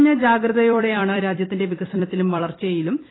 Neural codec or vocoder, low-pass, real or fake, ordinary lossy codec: vocoder, 44.1 kHz, 128 mel bands every 256 samples, BigVGAN v2; 7.2 kHz; fake; AAC, 16 kbps